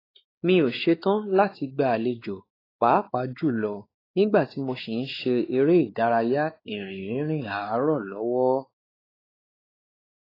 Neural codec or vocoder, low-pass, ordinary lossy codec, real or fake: codec, 16 kHz, 4 kbps, X-Codec, WavLM features, trained on Multilingual LibriSpeech; 5.4 kHz; AAC, 24 kbps; fake